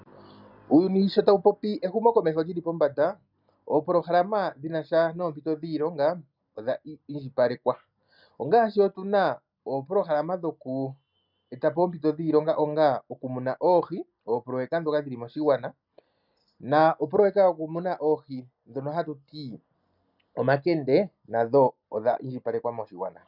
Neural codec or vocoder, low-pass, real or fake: none; 5.4 kHz; real